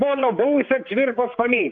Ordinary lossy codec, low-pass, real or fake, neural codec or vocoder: AAC, 48 kbps; 7.2 kHz; fake; codec, 16 kHz, 4 kbps, X-Codec, HuBERT features, trained on general audio